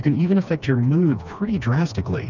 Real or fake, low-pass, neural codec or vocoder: fake; 7.2 kHz; codec, 16 kHz, 2 kbps, FreqCodec, smaller model